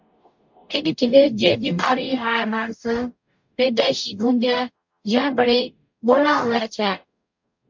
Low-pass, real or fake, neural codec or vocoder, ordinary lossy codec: 7.2 kHz; fake; codec, 44.1 kHz, 0.9 kbps, DAC; MP3, 48 kbps